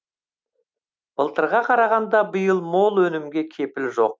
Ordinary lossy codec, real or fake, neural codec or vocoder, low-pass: none; real; none; none